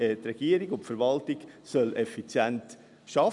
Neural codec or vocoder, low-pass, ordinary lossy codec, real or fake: none; 10.8 kHz; none; real